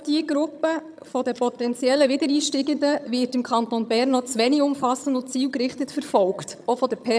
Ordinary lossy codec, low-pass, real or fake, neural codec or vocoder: none; none; fake; vocoder, 22.05 kHz, 80 mel bands, HiFi-GAN